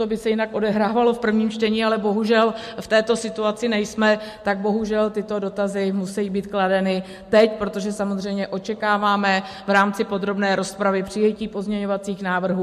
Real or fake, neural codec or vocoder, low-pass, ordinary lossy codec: real; none; 14.4 kHz; MP3, 64 kbps